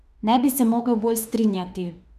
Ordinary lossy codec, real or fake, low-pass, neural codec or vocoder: none; fake; 14.4 kHz; autoencoder, 48 kHz, 32 numbers a frame, DAC-VAE, trained on Japanese speech